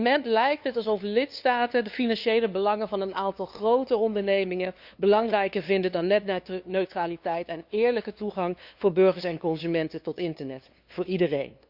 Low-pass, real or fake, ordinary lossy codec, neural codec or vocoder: 5.4 kHz; fake; Opus, 64 kbps; codec, 16 kHz, 2 kbps, FunCodec, trained on LibriTTS, 25 frames a second